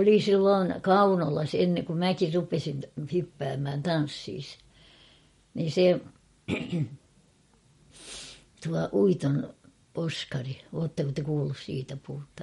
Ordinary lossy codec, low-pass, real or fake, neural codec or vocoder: MP3, 48 kbps; 19.8 kHz; real; none